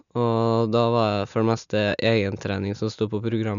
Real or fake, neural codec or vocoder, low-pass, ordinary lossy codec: real; none; 7.2 kHz; none